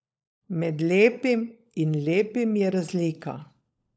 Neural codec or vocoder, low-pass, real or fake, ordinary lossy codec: codec, 16 kHz, 16 kbps, FunCodec, trained on LibriTTS, 50 frames a second; none; fake; none